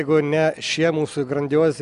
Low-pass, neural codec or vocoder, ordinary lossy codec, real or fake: 10.8 kHz; none; AAC, 96 kbps; real